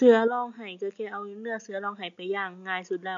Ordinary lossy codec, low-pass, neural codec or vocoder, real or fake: MP3, 64 kbps; 7.2 kHz; none; real